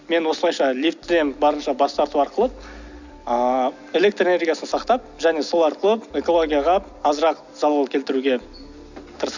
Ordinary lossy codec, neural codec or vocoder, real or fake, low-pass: none; none; real; 7.2 kHz